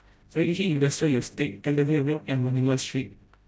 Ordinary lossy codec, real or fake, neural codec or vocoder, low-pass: none; fake; codec, 16 kHz, 0.5 kbps, FreqCodec, smaller model; none